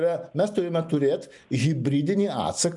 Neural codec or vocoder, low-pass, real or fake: none; 10.8 kHz; real